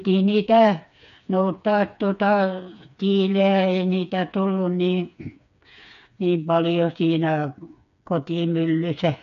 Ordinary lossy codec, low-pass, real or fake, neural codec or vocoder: MP3, 96 kbps; 7.2 kHz; fake; codec, 16 kHz, 4 kbps, FreqCodec, smaller model